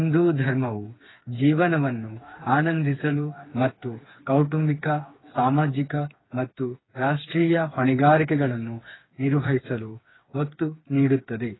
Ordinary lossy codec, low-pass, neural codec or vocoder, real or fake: AAC, 16 kbps; 7.2 kHz; codec, 16 kHz, 4 kbps, FreqCodec, smaller model; fake